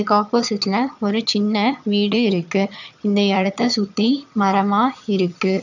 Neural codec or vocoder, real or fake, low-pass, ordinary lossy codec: vocoder, 22.05 kHz, 80 mel bands, HiFi-GAN; fake; 7.2 kHz; none